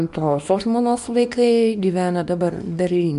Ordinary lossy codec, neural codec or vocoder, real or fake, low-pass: MP3, 64 kbps; codec, 24 kHz, 0.9 kbps, WavTokenizer, small release; fake; 10.8 kHz